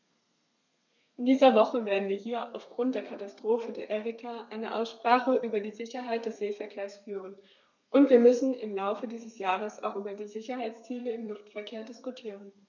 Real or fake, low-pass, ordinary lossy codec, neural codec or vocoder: fake; 7.2 kHz; none; codec, 32 kHz, 1.9 kbps, SNAC